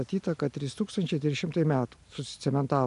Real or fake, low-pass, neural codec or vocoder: real; 10.8 kHz; none